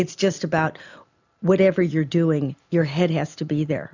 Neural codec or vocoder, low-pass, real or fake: none; 7.2 kHz; real